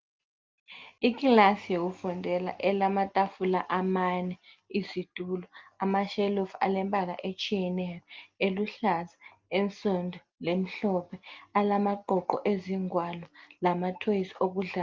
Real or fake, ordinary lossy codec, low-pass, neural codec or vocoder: real; Opus, 32 kbps; 7.2 kHz; none